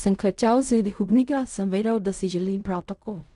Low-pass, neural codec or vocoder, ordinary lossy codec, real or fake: 10.8 kHz; codec, 16 kHz in and 24 kHz out, 0.4 kbps, LongCat-Audio-Codec, fine tuned four codebook decoder; none; fake